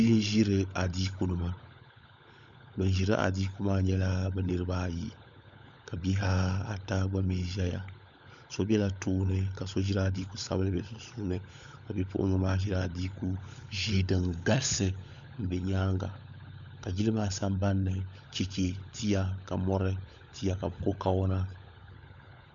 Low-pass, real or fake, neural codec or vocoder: 7.2 kHz; fake; codec, 16 kHz, 16 kbps, FunCodec, trained on LibriTTS, 50 frames a second